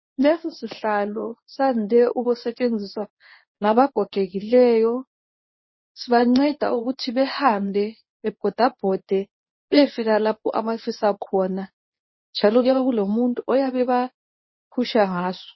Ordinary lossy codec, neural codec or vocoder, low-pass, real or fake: MP3, 24 kbps; codec, 24 kHz, 0.9 kbps, WavTokenizer, medium speech release version 1; 7.2 kHz; fake